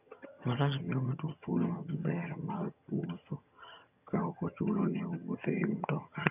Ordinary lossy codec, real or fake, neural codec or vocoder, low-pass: none; fake; vocoder, 22.05 kHz, 80 mel bands, HiFi-GAN; 3.6 kHz